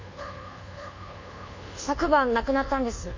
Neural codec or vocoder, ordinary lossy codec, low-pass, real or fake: codec, 24 kHz, 1.2 kbps, DualCodec; MP3, 64 kbps; 7.2 kHz; fake